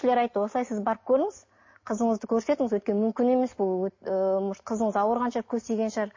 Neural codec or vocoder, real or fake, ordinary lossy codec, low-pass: none; real; MP3, 32 kbps; 7.2 kHz